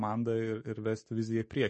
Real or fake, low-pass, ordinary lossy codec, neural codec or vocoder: real; 9.9 kHz; MP3, 32 kbps; none